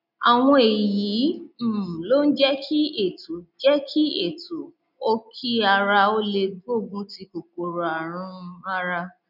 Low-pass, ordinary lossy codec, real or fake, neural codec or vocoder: 5.4 kHz; none; real; none